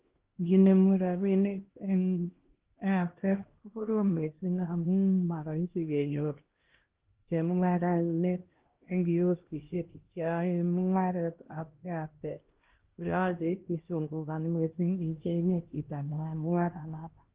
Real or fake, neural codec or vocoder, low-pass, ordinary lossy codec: fake; codec, 16 kHz, 1 kbps, X-Codec, HuBERT features, trained on LibriSpeech; 3.6 kHz; Opus, 16 kbps